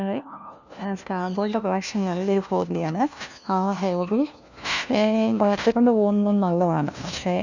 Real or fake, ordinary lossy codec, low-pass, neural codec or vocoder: fake; none; 7.2 kHz; codec, 16 kHz, 1 kbps, FunCodec, trained on LibriTTS, 50 frames a second